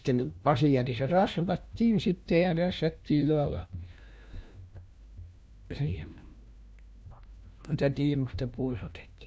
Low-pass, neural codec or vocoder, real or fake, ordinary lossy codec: none; codec, 16 kHz, 1 kbps, FunCodec, trained on LibriTTS, 50 frames a second; fake; none